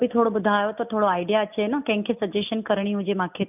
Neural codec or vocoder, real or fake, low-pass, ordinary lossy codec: none; real; 3.6 kHz; none